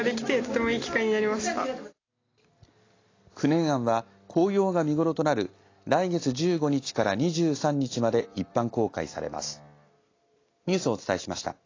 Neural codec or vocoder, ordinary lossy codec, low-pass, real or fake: none; AAC, 32 kbps; 7.2 kHz; real